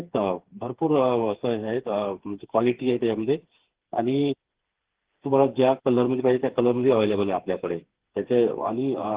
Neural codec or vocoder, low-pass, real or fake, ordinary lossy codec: codec, 16 kHz, 4 kbps, FreqCodec, smaller model; 3.6 kHz; fake; Opus, 16 kbps